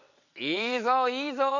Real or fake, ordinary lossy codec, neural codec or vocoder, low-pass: fake; none; vocoder, 22.05 kHz, 80 mel bands, WaveNeXt; 7.2 kHz